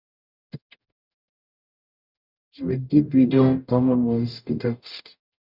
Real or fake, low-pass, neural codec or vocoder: fake; 5.4 kHz; codec, 44.1 kHz, 0.9 kbps, DAC